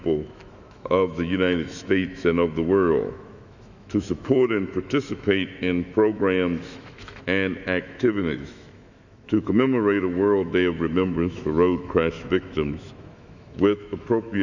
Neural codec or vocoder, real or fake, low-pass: autoencoder, 48 kHz, 128 numbers a frame, DAC-VAE, trained on Japanese speech; fake; 7.2 kHz